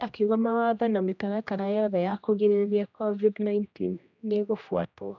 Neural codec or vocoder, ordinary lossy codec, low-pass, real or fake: codec, 16 kHz, 1 kbps, X-Codec, HuBERT features, trained on general audio; none; 7.2 kHz; fake